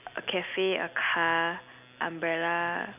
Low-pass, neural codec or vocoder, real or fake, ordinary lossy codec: 3.6 kHz; none; real; none